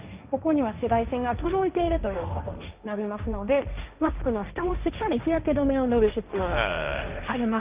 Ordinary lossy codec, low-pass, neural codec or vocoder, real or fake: Opus, 24 kbps; 3.6 kHz; codec, 16 kHz, 1.1 kbps, Voila-Tokenizer; fake